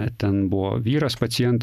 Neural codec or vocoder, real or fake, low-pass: none; real; 14.4 kHz